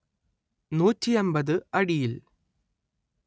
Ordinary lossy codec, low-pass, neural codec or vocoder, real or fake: none; none; none; real